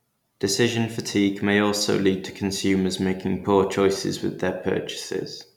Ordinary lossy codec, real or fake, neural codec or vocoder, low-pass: none; real; none; 19.8 kHz